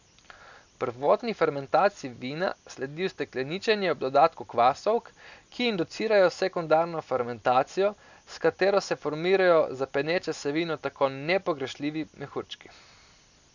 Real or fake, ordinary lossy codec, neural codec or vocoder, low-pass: real; none; none; 7.2 kHz